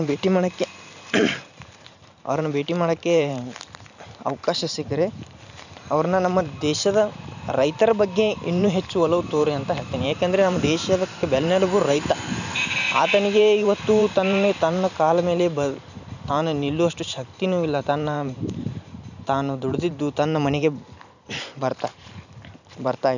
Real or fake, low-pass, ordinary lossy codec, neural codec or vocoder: fake; 7.2 kHz; none; vocoder, 44.1 kHz, 128 mel bands every 512 samples, BigVGAN v2